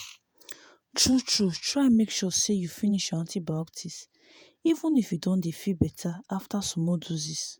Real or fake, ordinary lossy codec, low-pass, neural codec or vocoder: fake; none; none; vocoder, 48 kHz, 128 mel bands, Vocos